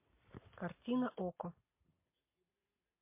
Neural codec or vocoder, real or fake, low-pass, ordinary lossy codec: none; real; 3.6 kHz; MP3, 24 kbps